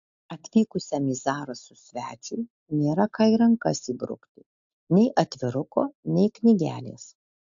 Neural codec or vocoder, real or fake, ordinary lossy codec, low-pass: none; real; AAC, 64 kbps; 7.2 kHz